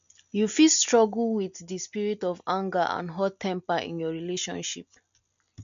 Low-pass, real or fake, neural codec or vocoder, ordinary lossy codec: 7.2 kHz; real; none; MP3, 64 kbps